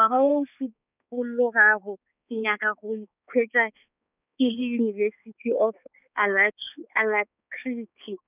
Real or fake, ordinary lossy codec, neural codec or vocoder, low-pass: fake; none; codec, 16 kHz, 4 kbps, X-Codec, HuBERT features, trained on balanced general audio; 3.6 kHz